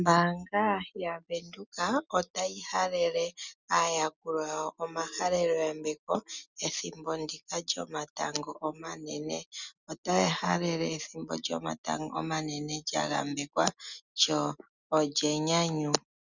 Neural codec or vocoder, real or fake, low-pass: none; real; 7.2 kHz